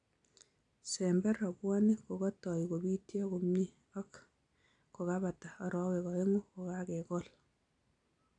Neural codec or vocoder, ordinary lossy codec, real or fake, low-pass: none; AAC, 48 kbps; real; 9.9 kHz